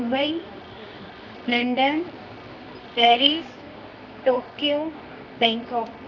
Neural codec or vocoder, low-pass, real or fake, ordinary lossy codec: codec, 24 kHz, 0.9 kbps, WavTokenizer, medium music audio release; 7.2 kHz; fake; none